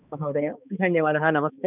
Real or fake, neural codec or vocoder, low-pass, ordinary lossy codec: fake; codec, 16 kHz, 2 kbps, X-Codec, HuBERT features, trained on balanced general audio; 3.6 kHz; none